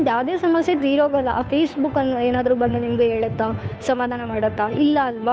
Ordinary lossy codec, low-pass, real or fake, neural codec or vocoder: none; none; fake; codec, 16 kHz, 2 kbps, FunCodec, trained on Chinese and English, 25 frames a second